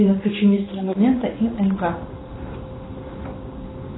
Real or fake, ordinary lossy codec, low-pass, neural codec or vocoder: fake; AAC, 16 kbps; 7.2 kHz; codec, 16 kHz in and 24 kHz out, 1 kbps, XY-Tokenizer